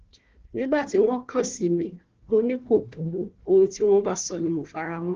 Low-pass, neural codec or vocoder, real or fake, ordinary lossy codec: 7.2 kHz; codec, 16 kHz, 1 kbps, FunCodec, trained on Chinese and English, 50 frames a second; fake; Opus, 16 kbps